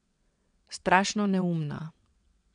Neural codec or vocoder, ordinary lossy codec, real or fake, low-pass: vocoder, 22.05 kHz, 80 mel bands, WaveNeXt; MP3, 96 kbps; fake; 9.9 kHz